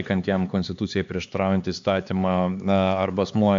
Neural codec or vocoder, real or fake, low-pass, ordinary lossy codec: codec, 16 kHz, 4 kbps, X-Codec, HuBERT features, trained on LibriSpeech; fake; 7.2 kHz; MP3, 64 kbps